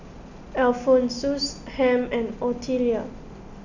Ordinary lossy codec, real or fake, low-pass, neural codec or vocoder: none; real; 7.2 kHz; none